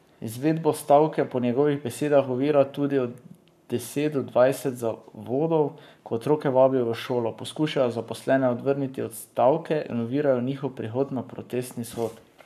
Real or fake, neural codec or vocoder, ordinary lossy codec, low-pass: fake; codec, 44.1 kHz, 7.8 kbps, Pupu-Codec; none; 14.4 kHz